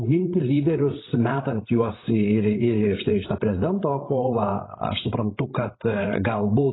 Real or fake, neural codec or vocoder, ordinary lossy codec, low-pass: fake; codec, 16 kHz, 16 kbps, FreqCodec, larger model; AAC, 16 kbps; 7.2 kHz